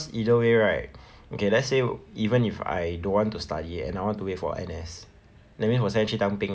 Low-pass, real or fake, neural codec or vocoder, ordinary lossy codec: none; real; none; none